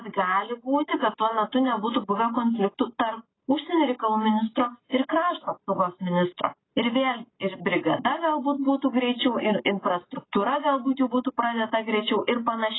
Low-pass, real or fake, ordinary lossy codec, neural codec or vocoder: 7.2 kHz; real; AAC, 16 kbps; none